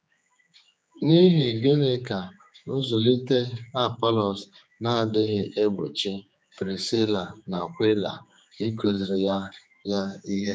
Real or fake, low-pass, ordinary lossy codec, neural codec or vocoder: fake; none; none; codec, 16 kHz, 4 kbps, X-Codec, HuBERT features, trained on general audio